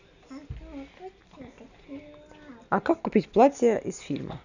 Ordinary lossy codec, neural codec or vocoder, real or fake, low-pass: none; none; real; 7.2 kHz